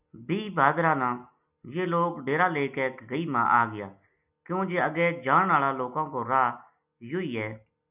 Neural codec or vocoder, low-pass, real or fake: none; 3.6 kHz; real